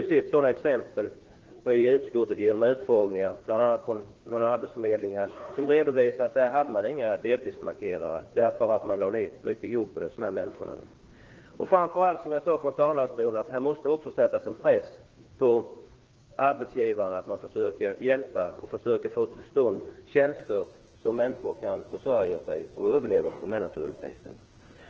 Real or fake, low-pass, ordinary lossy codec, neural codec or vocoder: fake; 7.2 kHz; Opus, 16 kbps; codec, 16 kHz, 2 kbps, FreqCodec, larger model